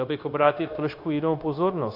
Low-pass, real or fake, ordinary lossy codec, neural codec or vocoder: 5.4 kHz; fake; AAC, 32 kbps; codec, 16 kHz, 0.9 kbps, LongCat-Audio-Codec